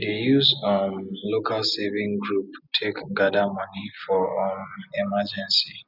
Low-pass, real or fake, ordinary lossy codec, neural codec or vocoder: 5.4 kHz; real; none; none